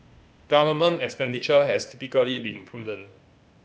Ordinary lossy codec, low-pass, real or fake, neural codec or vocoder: none; none; fake; codec, 16 kHz, 0.8 kbps, ZipCodec